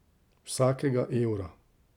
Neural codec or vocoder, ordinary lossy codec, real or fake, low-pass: none; none; real; 19.8 kHz